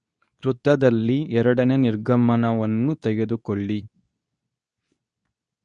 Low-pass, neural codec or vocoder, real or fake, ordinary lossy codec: 10.8 kHz; codec, 24 kHz, 0.9 kbps, WavTokenizer, medium speech release version 2; fake; none